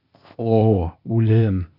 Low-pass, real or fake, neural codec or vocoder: 5.4 kHz; fake; codec, 16 kHz, 0.8 kbps, ZipCodec